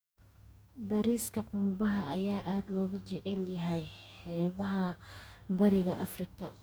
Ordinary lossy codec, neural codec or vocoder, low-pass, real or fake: none; codec, 44.1 kHz, 2.6 kbps, DAC; none; fake